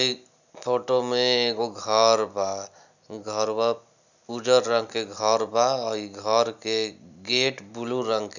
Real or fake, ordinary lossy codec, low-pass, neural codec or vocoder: real; none; 7.2 kHz; none